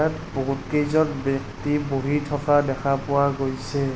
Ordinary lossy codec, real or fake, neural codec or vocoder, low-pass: none; real; none; none